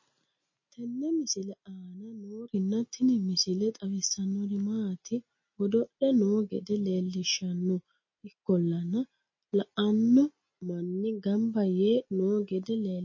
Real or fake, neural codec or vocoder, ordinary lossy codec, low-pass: real; none; MP3, 32 kbps; 7.2 kHz